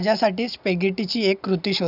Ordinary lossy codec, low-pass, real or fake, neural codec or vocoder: none; 5.4 kHz; real; none